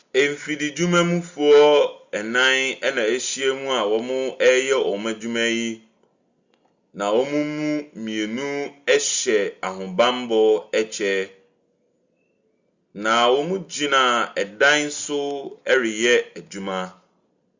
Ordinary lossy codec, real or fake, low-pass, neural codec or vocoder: Opus, 64 kbps; real; 7.2 kHz; none